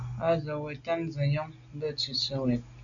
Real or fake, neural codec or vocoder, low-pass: real; none; 7.2 kHz